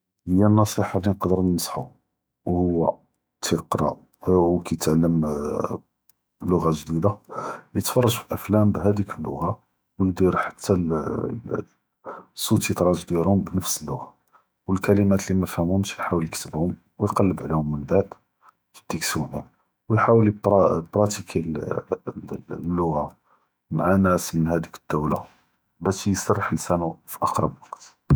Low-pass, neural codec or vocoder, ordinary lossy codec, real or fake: none; autoencoder, 48 kHz, 128 numbers a frame, DAC-VAE, trained on Japanese speech; none; fake